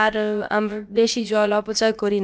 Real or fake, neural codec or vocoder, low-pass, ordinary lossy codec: fake; codec, 16 kHz, about 1 kbps, DyCAST, with the encoder's durations; none; none